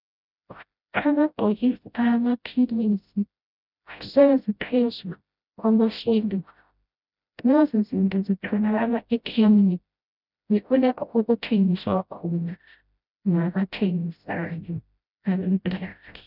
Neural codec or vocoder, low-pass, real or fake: codec, 16 kHz, 0.5 kbps, FreqCodec, smaller model; 5.4 kHz; fake